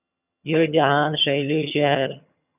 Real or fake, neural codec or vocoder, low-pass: fake; vocoder, 22.05 kHz, 80 mel bands, HiFi-GAN; 3.6 kHz